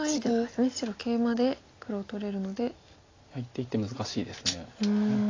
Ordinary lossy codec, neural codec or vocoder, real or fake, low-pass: AAC, 32 kbps; none; real; 7.2 kHz